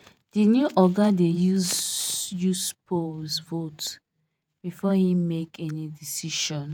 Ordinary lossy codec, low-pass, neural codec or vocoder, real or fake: none; none; vocoder, 48 kHz, 128 mel bands, Vocos; fake